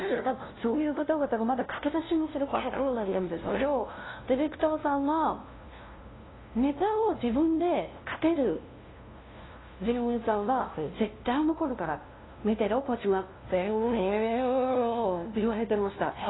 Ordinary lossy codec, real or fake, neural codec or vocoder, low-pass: AAC, 16 kbps; fake; codec, 16 kHz, 0.5 kbps, FunCodec, trained on LibriTTS, 25 frames a second; 7.2 kHz